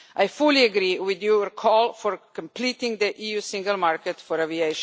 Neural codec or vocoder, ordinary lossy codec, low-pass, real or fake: none; none; none; real